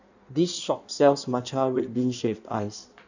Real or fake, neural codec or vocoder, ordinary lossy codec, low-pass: fake; codec, 16 kHz in and 24 kHz out, 1.1 kbps, FireRedTTS-2 codec; none; 7.2 kHz